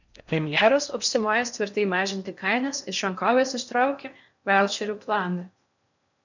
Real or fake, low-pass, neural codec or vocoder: fake; 7.2 kHz; codec, 16 kHz in and 24 kHz out, 0.6 kbps, FocalCodec, streaming, 4096 codes